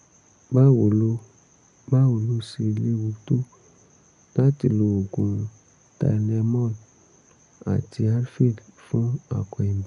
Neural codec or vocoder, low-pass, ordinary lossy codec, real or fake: none; 10.8 kHz; none; real